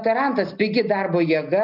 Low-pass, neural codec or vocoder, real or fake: 5.4 kHz; none; real